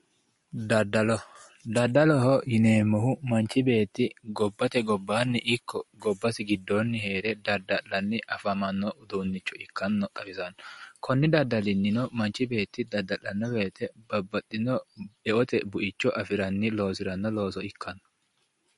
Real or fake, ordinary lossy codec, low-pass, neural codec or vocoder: real; MP3, 48 kbps; 14.4 kHz; none